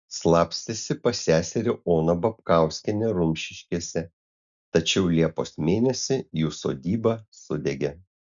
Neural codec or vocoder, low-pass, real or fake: none; 7.2 kHz; real